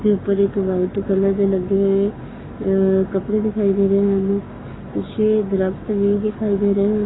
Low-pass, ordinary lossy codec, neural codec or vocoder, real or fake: 7.2 kHz; AAC, 16 kbps; codec, 44.1 kHz, 7.8 kbps, Pupu-Codec; fake